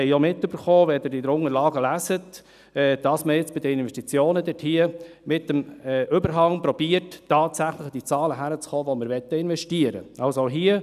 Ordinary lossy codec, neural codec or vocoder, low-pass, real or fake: none; none; 14.4 kHz; real